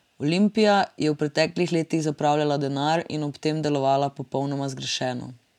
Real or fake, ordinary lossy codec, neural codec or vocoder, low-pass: real; none; none; 19.8 kHz